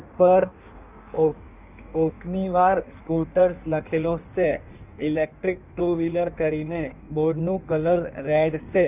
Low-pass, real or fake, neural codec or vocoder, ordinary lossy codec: 3.6 kHz; fake; codec, 16 kHz in and 24 kHz out, 1.1 kbps, FireRedTTS-2 codec; none